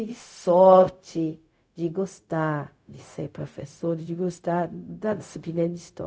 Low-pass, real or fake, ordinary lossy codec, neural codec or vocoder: none; fake; none; codec, 16 kHz, 0.4 kbps, LongCat-Audio-Codec